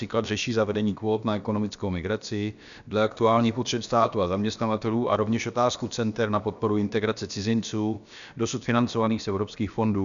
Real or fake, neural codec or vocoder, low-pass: fake; codec, 16 kHz, about 1 kbps, DyCAST, with the encoder's durations; 7.2 kHz